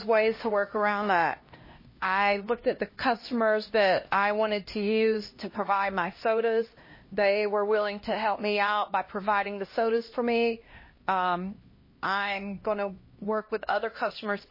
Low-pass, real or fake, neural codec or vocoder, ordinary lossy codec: 5.4 kHz; fake; codec, 16 kHz, 1 kbps, X-Codec, HuBERT features, trained on LibriSpeech; MP3, 24 kbps